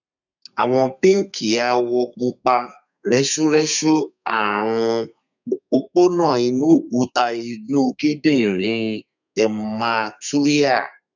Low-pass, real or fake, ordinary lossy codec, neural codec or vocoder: 7.2 kHz; fake; none; codec, 32 kHz, 1.9 kbps, SNAC